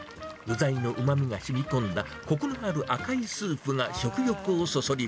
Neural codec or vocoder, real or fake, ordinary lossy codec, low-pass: none; real; none; none